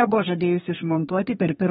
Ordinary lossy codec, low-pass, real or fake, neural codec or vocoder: AAC, 16 kbps; 14.4 kHz; fake; codec, 32 kHz, 1.9 kbps, SNAC